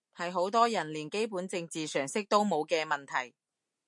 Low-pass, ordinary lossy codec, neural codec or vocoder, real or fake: 10.8 kHz; MP3, 64 kbps; none; real